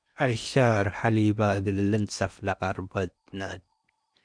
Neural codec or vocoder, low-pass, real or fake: codec, 16 kHz in and 24 kHz out, 0.8 kbps, FocalCodec, streaming, 65536 codes; 9.9 kHz; fake